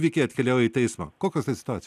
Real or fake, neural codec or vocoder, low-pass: real; none; 14.4 kHz